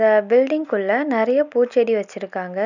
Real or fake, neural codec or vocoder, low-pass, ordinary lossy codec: real; none; 7.2 kHz; none